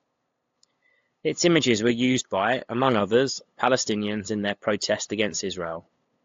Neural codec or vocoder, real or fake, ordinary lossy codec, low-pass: codec, 16 kHz, 8 kbps, FunCodec, trained on LibriTTS, 25 frames a second; fake; AAC, 48 kbps; 7.2 kHz